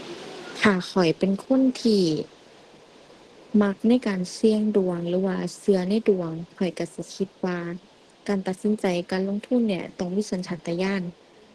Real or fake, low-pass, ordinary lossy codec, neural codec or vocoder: real; 10.8 kHz; Opus, 16 kbps; none